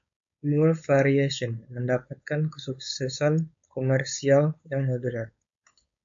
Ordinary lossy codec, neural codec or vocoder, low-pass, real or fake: MP3, 48 kbps; codec, 16 kHz, 4.8 kbps, FACodec; 7.2 kHz; fake